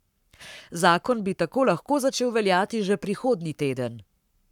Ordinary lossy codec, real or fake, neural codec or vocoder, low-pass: none; fake; codec, 44.1 kHz, 7.8 kbps, Pupu-Codec; 19.8 kHz